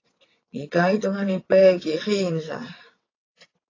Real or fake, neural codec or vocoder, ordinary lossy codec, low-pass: fake; vocoder, 22.05 kHz, 80 mel bands, WaveNeXt; AAC, 32 kbps; 7.2 kHz